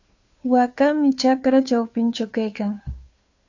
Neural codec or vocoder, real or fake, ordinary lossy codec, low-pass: codec, 16 kHz, 2 kbps, FunCodec, trained on Chinese and English, 25 frames a second; fake; AAC, 48 kbps; 7.2 kHz